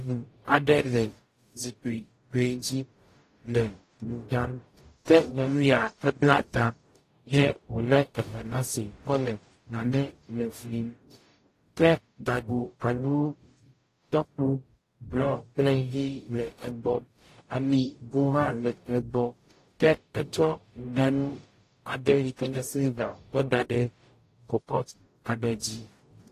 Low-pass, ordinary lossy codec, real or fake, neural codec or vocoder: 14.4 kHz; AAC, 48 kbps; fake; codec, 44.1 kHz, 0.9 kbps, DAC